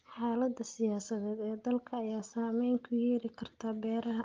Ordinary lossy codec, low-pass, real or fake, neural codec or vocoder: Opus, 24 kbps; 7.2 kHz; real; none